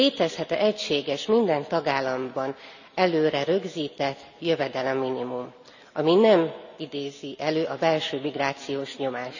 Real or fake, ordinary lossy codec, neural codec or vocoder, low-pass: real; none; none; 7.2 kHz